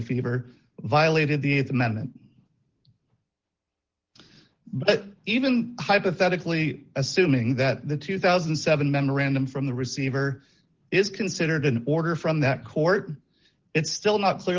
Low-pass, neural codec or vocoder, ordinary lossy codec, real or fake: 7.2 kHz; none; Opus, 16 kbps; real